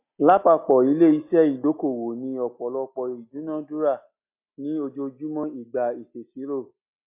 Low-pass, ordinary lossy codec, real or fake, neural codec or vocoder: 3.6 kHz; MP3, 32 kbps; real; none